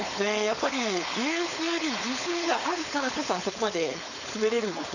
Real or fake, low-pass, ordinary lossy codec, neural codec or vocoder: fake; 7.2 kHz; none; codec, 16 kHz, 4.8 kbps, FACodec